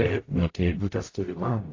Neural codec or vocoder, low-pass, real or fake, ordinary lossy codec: codec, 44.1 kHz, 0.9 kbps, DAC; 7.2 kHz; fake; AAC, 32 kbps